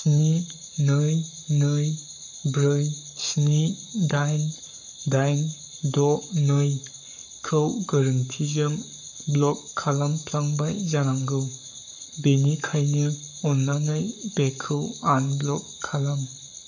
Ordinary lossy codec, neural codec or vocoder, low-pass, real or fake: none; codec, 44.1 kHz, 7.8 kbps, Pupu-Codec; 7.2 kHz; fake